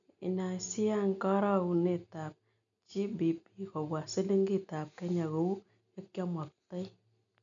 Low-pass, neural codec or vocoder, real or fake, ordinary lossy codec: 7.2 kHz; none; real; none